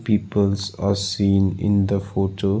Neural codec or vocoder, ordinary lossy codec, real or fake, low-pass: none; none; real; none